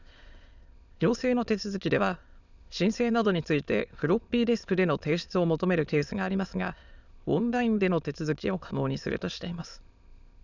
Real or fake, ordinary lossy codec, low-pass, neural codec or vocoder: fake; none; 7.2 kHz; autoencoder, 22.05 kHz, a latent of 192 numbers a frame, VITS, trained on many speakers